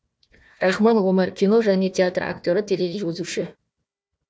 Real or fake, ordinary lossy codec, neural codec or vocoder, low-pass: fake; none; codec, 16 kHz, 1 kbps, FunCodec, trained on Chinese and English, 50 frames a second; none